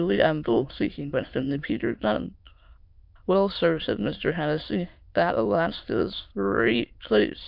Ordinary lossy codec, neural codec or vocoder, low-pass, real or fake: MP3, 48 kbps; autoencoder, 22.05 kHz, a latent of 192 numbers a frame, VITS, trained on many speakers; 5.4 kHz; fake